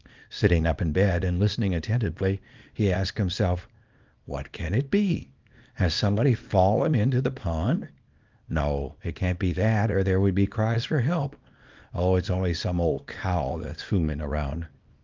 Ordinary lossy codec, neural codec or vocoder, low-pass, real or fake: Opus, 24 kbps; codec, 24 kHz, 0.9 kbps, WavTokenizer, small release; 7.2 kHz; fake